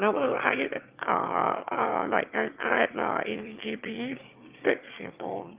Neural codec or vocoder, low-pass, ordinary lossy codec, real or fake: autoencoder, 22.05 kHz, a latent of 192 numbers a frame, VITS, trained on one speaker; 3.6 kHz; Opus, 32 kbps; fake